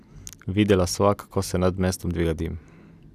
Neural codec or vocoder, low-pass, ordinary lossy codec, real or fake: none; 14.4 kHz; none; real